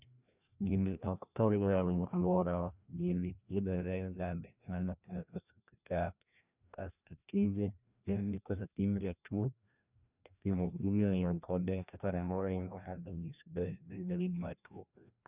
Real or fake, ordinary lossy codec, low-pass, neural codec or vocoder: fake; none; 3.6 kHz; codec, 16 kHz, 1 kbps, FreqCodec, larger model